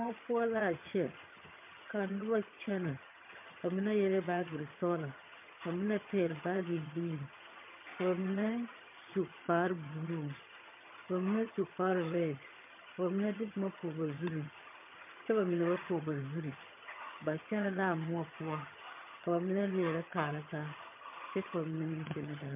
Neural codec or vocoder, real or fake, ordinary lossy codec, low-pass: vocoder, 22.05 kHz, 80 mel bands, HiFi-GAN; fake; MP3, 32 kbps; 3.6 kHz